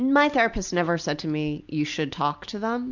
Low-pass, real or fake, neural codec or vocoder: 7.2 kHz; real; none